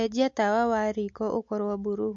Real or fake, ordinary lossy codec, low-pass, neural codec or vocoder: real; MP3, 48 kbps; 7.2 kHz; none